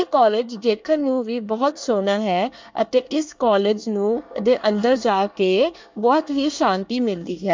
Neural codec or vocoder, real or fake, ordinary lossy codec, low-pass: codec, 24 kHz, 1 kbps, SNAC; fake; MP3, 64 kbps; 7.2 kHz